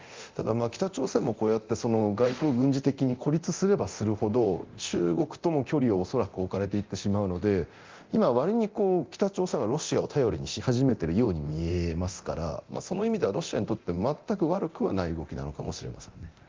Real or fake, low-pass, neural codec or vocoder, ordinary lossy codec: fake; 7.2 kHz; codec, 24 kHz, 0.9 kbps, DualCodec; Opus, 32 kbps